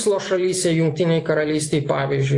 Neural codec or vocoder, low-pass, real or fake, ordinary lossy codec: none; 10.8 kHz; real; AAC, 48 kbps